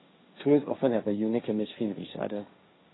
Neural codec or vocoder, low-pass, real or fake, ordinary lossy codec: codec, 16 kHz, 1.1 kbps, Voila-Tokenizer; 7.2 kHz; fake; AAC, 16 kbps